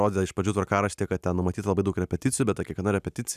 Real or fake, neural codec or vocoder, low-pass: real; none; 14.4 kHz